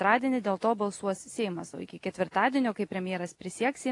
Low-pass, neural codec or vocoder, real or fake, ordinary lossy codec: 10.8 kHz; none; real; AAC, 48 kbps